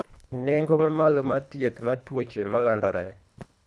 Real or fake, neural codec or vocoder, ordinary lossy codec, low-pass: fake; codec, 24 kHz, 1.5 kbps, HILCodec; none; none